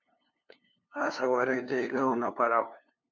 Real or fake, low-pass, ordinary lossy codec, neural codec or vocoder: fake; 7.2 kHz; MP3, 48 kbps; codec, 16 kHz, 2 kbps, FunCodec, trained on LibriTTS, 25 frames a second